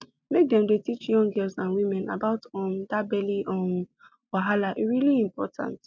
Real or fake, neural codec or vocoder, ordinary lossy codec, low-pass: real; none; none; none